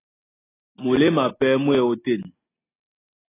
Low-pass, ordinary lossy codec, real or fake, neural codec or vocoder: 3.6 kHz; AAC, 16 kbps; real; none